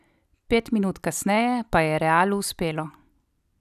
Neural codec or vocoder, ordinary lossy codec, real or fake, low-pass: none; none; real; 14.4 kHz